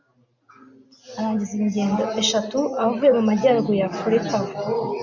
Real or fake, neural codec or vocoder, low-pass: real; none; 7.2 kHz